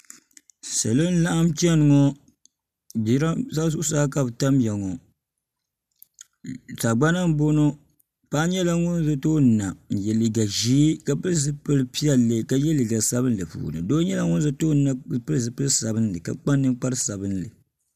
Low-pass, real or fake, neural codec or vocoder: 14.4 kHz; real; none